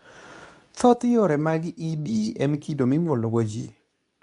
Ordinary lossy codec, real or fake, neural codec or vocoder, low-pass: none; fake; codec, 24 kHz, 0.9 kbps, WavTokenizer, medium speech release version 2; 10.8 kHz